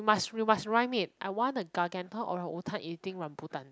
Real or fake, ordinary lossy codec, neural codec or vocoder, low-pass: real; none; none; none